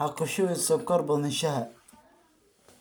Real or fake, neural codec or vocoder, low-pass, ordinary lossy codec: real; none; none; none